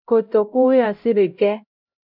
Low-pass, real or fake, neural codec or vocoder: 5.4 kHz; fake; codec, 16 kHz, 0.5 kbps, X-Codec, HuBERT features, trained on LibriSpeech